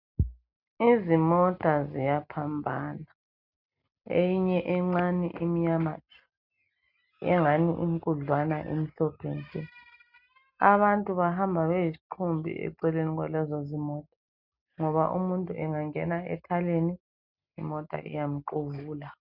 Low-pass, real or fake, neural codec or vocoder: 5.4 kHz; real; none